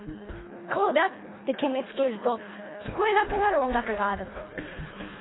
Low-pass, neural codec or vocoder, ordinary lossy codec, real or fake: 7.2 kHz; codec, 24 kHz, 1.5 kbps, HILCodec; AAC, 16 kbps; fake